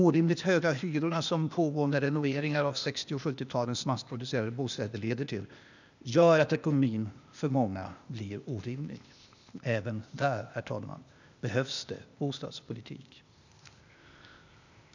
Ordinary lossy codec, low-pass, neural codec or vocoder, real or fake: none; 7.2 kHz; codec, 16 kHz, 0.8 kbps, ZipCodec; fake